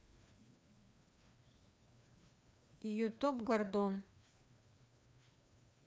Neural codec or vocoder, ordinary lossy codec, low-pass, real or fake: codec, 16 kHz, 2 kbps, FreqCodec, larger model; none; none; fake